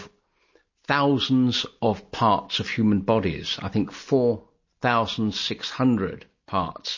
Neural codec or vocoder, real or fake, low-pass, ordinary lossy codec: none; real; 7.2 kHz; MP3, 32 kbps